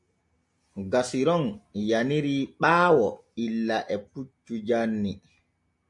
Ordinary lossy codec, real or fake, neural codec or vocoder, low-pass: AAC, 64 kbps; real; none; 10.8 kHz